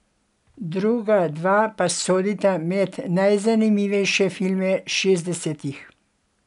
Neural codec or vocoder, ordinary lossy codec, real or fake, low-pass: none; MP3, 96 kbps; real; 10.8 kHz